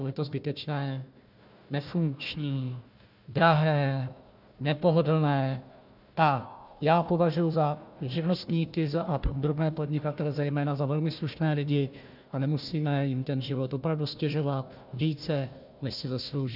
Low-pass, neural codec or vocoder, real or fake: 5.4 kHz; codec, 16 kHz, 1 kbps, FunCodec, trained on Chinese and English, 50 frames a second; fake